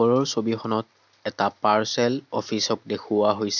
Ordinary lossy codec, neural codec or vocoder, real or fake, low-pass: none; none; real; 7.2 kHz